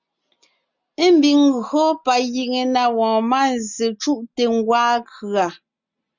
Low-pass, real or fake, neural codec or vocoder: 7.2 kHz; real; none